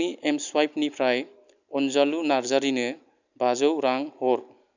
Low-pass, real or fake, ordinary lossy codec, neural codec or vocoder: 7.2 kHz; real; none; none